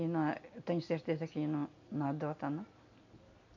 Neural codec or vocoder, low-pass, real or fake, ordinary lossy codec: none; 7.2 kHz; real; none